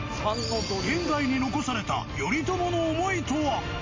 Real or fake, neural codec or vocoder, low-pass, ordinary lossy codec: real; none; 7.2 kHz; MP3, 32 kbps